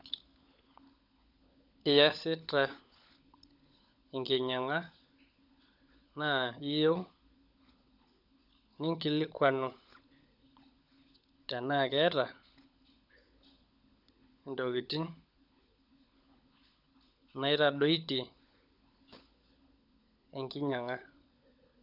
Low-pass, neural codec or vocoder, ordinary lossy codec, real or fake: 5.4 kHz; codec, 16 kHz, 16 kbps, FunCodec, trained on LibriTTS, 50 frames a second; none; fake